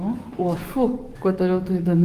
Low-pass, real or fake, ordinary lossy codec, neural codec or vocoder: 14.4 kHz; fake; Opus, 24 kbps; codec, 44.1 kHz, 7.8 kbps, DAC